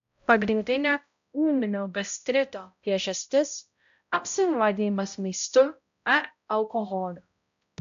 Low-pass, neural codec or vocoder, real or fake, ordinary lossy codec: 7.2 kHz; codec, 16 kHz, 0.5 kbps, X-Codec, HuBERT features, trained on balanced general audio; fake; AAC, 64 kbps